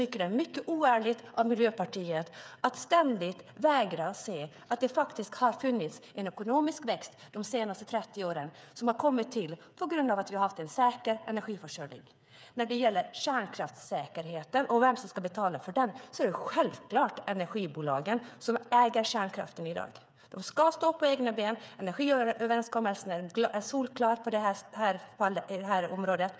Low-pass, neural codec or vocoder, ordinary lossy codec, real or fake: none; codec, 16 kHz, 8 kbps, FreqCodec, smaller model; none; fake